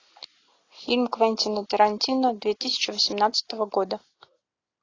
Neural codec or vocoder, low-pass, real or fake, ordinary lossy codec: none; 7.2 kHz; real; AAC, 32 kbps